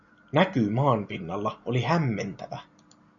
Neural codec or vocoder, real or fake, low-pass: none; real; 7.2 kHz